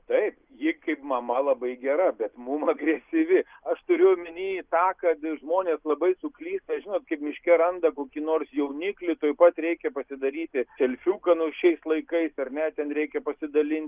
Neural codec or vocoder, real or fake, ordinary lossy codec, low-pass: none; real; Opus, 24 kbps; 3.6 kHz